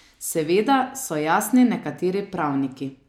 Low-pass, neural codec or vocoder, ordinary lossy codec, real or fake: 19.8 kHz; none; MP3, 96 kbps; real